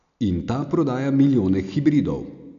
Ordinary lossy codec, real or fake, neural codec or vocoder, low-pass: none; real; none; 7.2 kHz